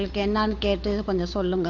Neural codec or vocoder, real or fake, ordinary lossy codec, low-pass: codec, 16 kHz, 8 kbps, FunCodec, trained on Chinese and English, 25 frames a second; fake; AAC, 48 kbps; 7.2 kHz